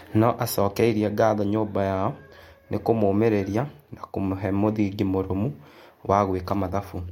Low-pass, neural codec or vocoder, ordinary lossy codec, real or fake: 19.8 kHz; none; MP3, 64 kbps; real